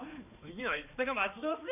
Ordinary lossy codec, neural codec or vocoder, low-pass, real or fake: none; codec, 16 kHz, 2 kbps, X-Codec, HuBERT features, trained on balanced general audio; 3.6 kHz; fake